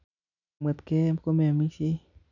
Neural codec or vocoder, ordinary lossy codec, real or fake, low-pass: none; none; real; 7.2 kHz